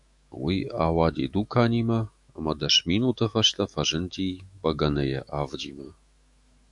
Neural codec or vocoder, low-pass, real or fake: autoencoder, 48 kHz, 128 numbers a frame, DAC-VAE, trained on Japanese speech; 10.8 kHz; fake